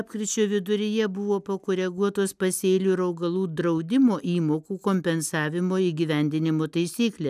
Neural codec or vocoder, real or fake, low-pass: none; real; 14.4 kHz